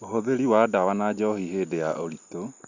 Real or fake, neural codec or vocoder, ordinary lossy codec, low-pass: real; none; Opus, 64 kbps; 7.2 kHz